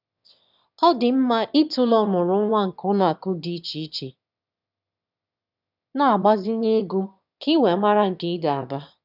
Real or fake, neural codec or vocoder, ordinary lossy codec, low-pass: fake; autoencoder, 22.05 kHz, a latent of 192 numbers a frame, VITS, trained on one speaker; none; 5.4 kHz